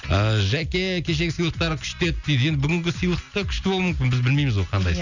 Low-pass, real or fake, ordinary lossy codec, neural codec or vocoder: 7.2 kHz; real; none; none